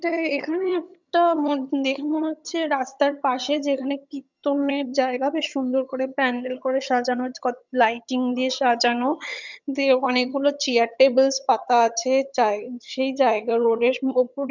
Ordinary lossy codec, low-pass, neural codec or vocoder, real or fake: none; 7.2 kHz; vocoder, 22.05 kHz, 80 mel bands, HiFi-GAN; fake